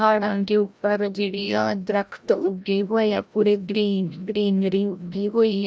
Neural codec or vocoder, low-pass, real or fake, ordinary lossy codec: codec, 16 kHz, 0.5 kbps, FreqCodec, larger model; none; fake; none